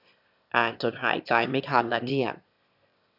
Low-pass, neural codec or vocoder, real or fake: 5.4 kHz; autoencoder, 22.05 kHz, a latent of 192 numbers a frame, VITS, trained on one speaker; fake